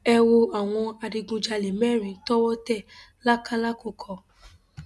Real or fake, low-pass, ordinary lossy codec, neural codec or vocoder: real; none; none; none